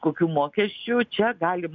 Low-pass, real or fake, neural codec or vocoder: 7.2 kHz; real; none